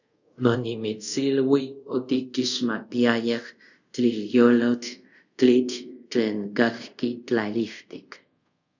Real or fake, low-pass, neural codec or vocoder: fake; 7.2 kHz; codec, 24 kHz, 0.5 kbps, DualCodec